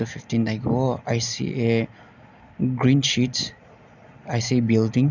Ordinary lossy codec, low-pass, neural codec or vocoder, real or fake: none; 7.2 kHz; none; real